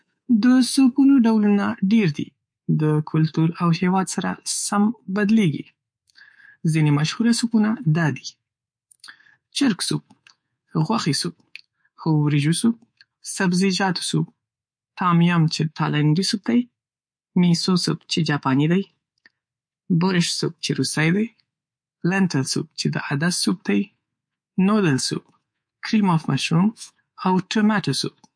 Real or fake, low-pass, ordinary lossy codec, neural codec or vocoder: fake; 9.9 kHz; MP3, 48 kbps; codec, 24 kHz, 3.1 kbps, DualCodec